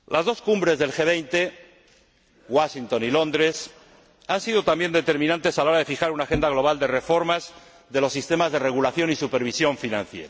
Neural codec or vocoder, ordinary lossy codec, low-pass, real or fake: none; none; none; real